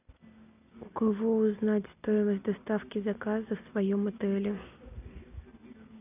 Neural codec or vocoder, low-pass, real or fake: none; 3.6 kHz; real